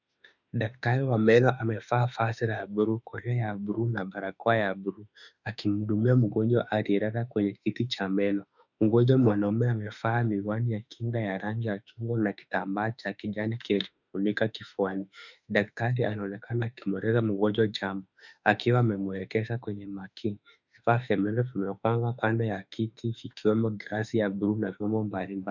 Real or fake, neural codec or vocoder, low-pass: fake; autoencoder, 48 kHz, 32 numbers a frame, DAC-VAE, trained on Japanese speech; 7.2 kHz